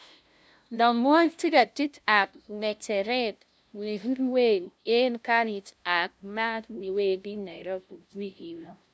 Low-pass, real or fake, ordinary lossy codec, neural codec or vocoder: none; fake; none; codec, 16 kHz, 0.5 kbps, FunCodec, trained on LibriTTS, 25 frames a second